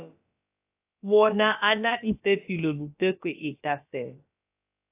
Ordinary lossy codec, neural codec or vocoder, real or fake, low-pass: AAC, 24 kbps; codec, 16 kHz, about 1 kbps, DyCAST, with the encoder's durations; fake; 3.6 kHz